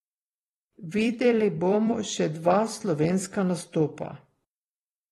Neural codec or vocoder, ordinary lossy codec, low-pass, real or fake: vocoder, 44.1 kHz, 128 mel bands every 256 samples, BigVGAN v2; AAC, 32 kbps; 19.8 kHz; fake